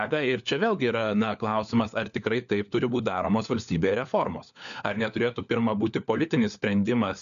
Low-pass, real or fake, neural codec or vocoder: 7.2 kHz; fake; codec, 16 kHz, 4 kbps, FunCodec, trained on LibriTTS, 50 frames a second